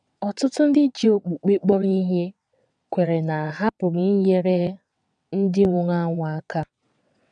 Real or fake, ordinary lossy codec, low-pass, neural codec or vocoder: fake; none; 9.9 kHz; vocoder, 22.05 kHz, 80 mel bands, WaveNeXt